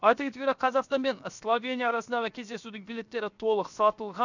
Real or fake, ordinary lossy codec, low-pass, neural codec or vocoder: fake; none; 7.2 kHz; codec, 16 kHz, 0.7 kbps, FocalCodec